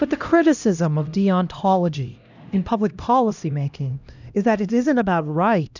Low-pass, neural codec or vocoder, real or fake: 7.2 kHz; codec, 16 kHz, 1 kbps, X-Codec, HuBERT features, trained on LibriSpeech; fake